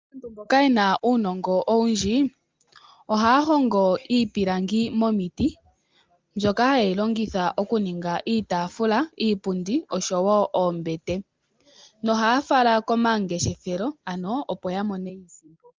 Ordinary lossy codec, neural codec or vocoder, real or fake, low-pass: Opus, 32 kbps; none; real; 7.2 kHz